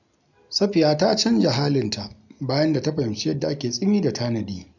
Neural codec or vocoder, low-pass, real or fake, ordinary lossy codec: none; 7.2 kHz; real; none